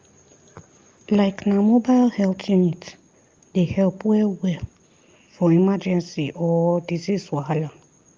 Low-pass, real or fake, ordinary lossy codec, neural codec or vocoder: 7.2 kHz; real; Opus, 32 kbps; none